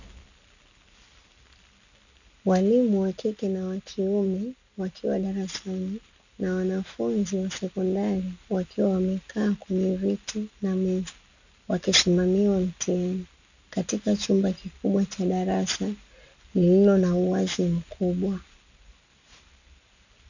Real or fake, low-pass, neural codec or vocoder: real; 7.2 kHz; none